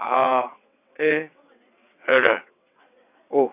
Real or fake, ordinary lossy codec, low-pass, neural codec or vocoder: fake; none; 3.6 kHz; vocoder, 22.05 kHz, 80 mel bands, WaveNeXt